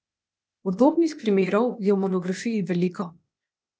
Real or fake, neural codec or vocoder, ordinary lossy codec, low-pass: fake; codec, 16 kHz, 0.8 kbps, ZipCodec; none; none